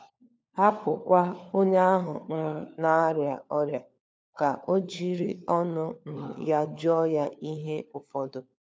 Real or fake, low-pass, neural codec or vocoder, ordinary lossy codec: fake; none; codec, 16 kHz, 4 kbps, FunCodec, trained on LibriTTS, 50 frames a second; none